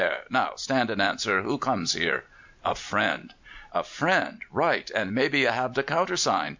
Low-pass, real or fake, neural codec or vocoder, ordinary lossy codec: 7.2 kHz; real; none; MP3, 64 kbps